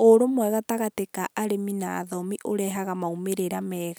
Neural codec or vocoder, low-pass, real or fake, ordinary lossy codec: none; none; real; none